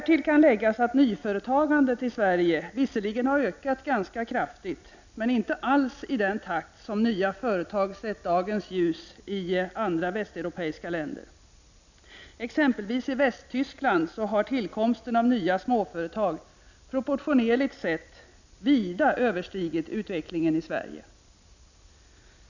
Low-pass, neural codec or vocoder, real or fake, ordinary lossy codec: 7.2 kHz; none; real; none